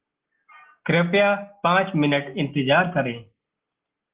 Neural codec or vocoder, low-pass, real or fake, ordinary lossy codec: none; 3.6 kHz; real; Opus, 16 kbps